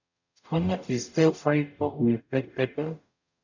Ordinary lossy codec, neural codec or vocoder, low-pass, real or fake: none; codec, 44.1 kHz, 0.9 kbps, DAC; 7.2 kHz; fake